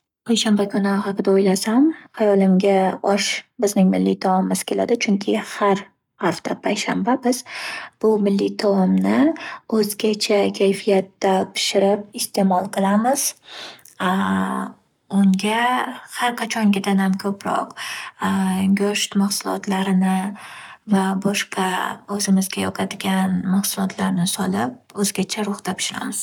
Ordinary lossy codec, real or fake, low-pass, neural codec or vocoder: none; fake; 19.8 kHz; codec, 44.1 kHz, 7.8 kbps, Pupu-Codec